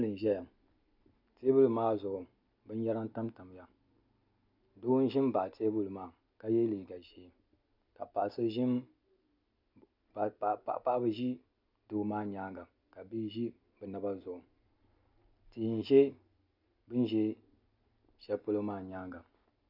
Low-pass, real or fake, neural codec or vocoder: 5.4 kHz; real; none